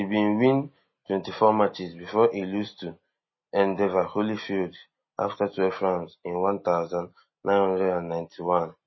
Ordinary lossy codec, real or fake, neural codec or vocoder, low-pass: MP3, 24 kbps; real; none; 7.2 kHz